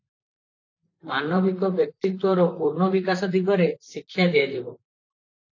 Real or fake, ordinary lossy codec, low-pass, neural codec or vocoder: fake; AAC, 48 kbps; 7.2 kHz; vocoder, 44.1 kHz, 128 mel bands, Pupu-Vocoder